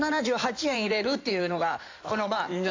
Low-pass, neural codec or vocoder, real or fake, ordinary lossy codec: 7.2 kHz; codec, 16 kHz in and 24 kHz out, 2.2 kbps, FireRedTTS-2 codec; fake; AAC, 48 kbps